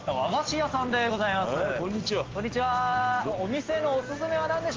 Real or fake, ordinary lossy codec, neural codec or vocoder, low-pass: real; Opus, 16 kbps; none; 7.2 kHz